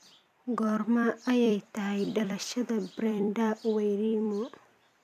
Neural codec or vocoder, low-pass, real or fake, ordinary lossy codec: vocoder, 44.1 kHz, 128 mel bands every 256 samples, BigVGAN v2; 14.4 kHz; fake; none